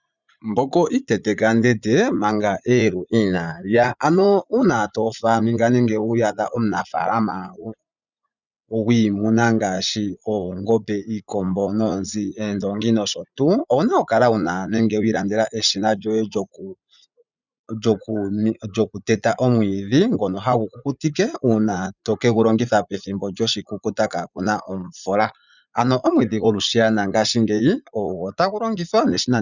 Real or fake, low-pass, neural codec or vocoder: fake; 7.2 kHz; vocoder, 44.1 kHz, 80 mel bands, Vocos